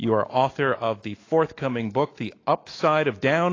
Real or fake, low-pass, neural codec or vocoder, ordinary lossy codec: fake; 7.2 kHz; autoencoder, 48 kHz, 128 numbers a frame, DAC-VAE, trained on Japanese speech; AAC, 32 kbps